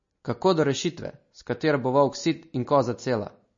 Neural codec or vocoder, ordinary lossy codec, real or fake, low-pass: none; MP3, 32 kbps; real; 7.2 kHz